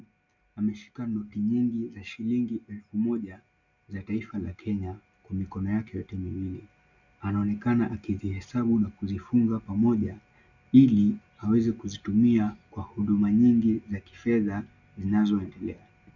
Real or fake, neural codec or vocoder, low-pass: real; none; 7.2 kHz